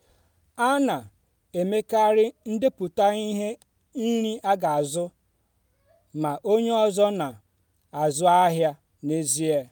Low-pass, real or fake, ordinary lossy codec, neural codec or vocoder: none; real; none; none